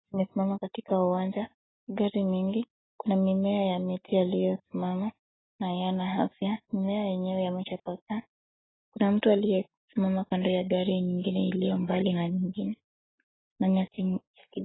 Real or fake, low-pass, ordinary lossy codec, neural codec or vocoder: real; 7.2 kHz; AAC, 16 kbps; none